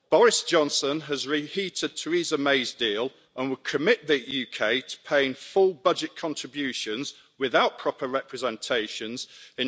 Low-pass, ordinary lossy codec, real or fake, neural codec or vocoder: none; none; real; none